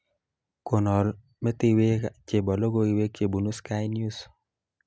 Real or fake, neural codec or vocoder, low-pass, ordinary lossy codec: real; none; none; none